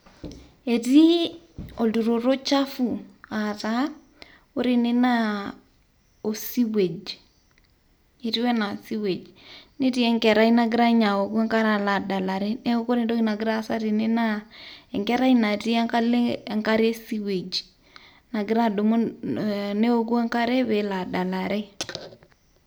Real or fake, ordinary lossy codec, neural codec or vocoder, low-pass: real; none; none; none